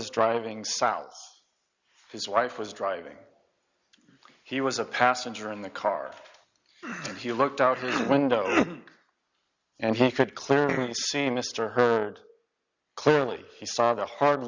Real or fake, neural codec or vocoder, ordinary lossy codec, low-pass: real; none; Opus, 64 kbps; 7.2 kHz